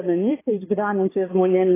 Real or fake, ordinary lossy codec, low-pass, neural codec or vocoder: fake; AAC, 16 kbps; 3.6 kHz; codec, 16 kHz, 2 kbps, X-Codec, HuBERT features, trained on balanced general audio